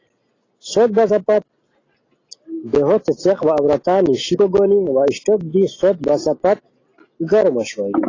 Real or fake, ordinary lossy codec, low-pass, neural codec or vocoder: real; AAC, 32 kbps; 7.2 kHz; none